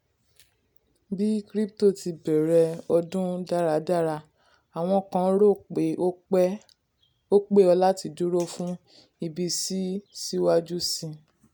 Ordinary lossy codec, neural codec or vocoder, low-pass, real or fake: none; none; none; real